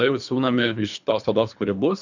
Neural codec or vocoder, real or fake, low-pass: codec, 24 kHz, 3 kbps, HILCodec; fake; 7.2 kHz